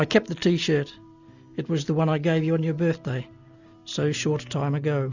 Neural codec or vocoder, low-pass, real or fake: none; 7.2 kHz; real